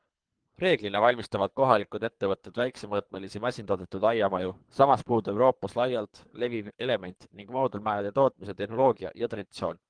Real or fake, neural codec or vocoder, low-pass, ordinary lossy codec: fake; codec, 24 kHz, 3 kbps, HILCodec; 9.9 kHz; Opus, 24 kbps